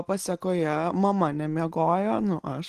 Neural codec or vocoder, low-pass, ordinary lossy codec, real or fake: none; 14.4 kHz; Opus, 16 kbps; real